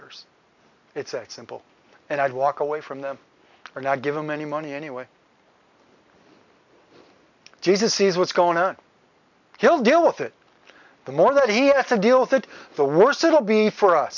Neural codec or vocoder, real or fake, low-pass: none; real; 7.2 kHz